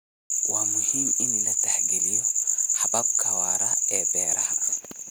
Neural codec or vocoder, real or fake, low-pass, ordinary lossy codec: none; real; none; none